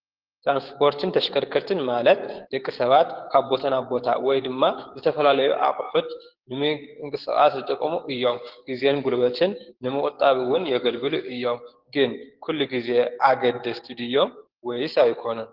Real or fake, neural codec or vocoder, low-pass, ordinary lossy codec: fake; codec, 16 kHz, 6 kbps, DAC; 5.4 kHz; Opus, 16 kbps